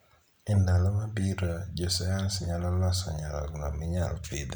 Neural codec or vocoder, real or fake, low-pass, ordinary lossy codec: none; real; none; none